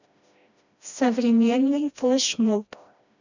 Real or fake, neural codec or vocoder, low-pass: fake; codec, 16 kHz, 1 kbps, FreqCodec, smaller model; 7.2 kHz